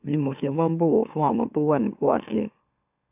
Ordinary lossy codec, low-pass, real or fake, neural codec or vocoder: AAC, 32 kbps; 3.6 kHz; fake; autoencoder, 44.1 kHz, a latent of 192 numbers a frame, MeloTTS